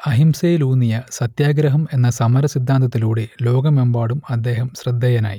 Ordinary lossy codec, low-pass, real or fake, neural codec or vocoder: none; 19.8 kHz; real; none